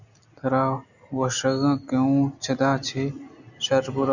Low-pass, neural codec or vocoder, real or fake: 7.2 kHz; none; real